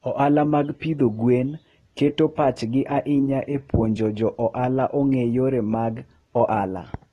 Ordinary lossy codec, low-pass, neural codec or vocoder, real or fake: AAC, 32 kbps; 19.8 kHz; none; real